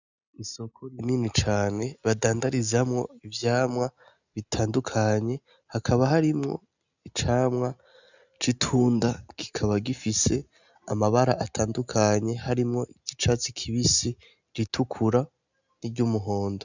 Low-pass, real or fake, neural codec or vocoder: 7.2 kHz; real; none